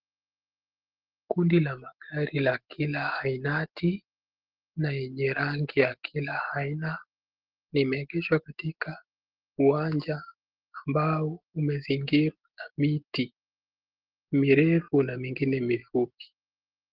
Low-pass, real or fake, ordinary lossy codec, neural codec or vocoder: 5.4 kHz; real; Opus, 16 kbps; none